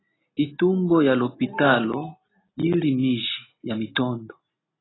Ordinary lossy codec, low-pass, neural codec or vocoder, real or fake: AAC, 16 kbps; 7.2 kHz; none; real